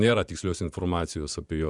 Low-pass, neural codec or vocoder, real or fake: 10.8 kHz; vocoder, 24 kHz, 100 mel bands, Vocos; fake